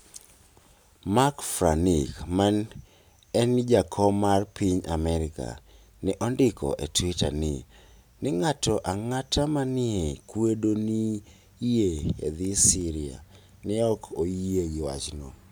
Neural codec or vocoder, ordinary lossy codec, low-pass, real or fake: none; none; none; real